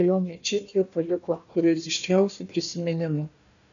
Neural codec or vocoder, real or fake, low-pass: codec, 16 kHz, 1 kbps, FunCodec, trained on Chinese and English, 50 frames a second; fake; 7.2 kHz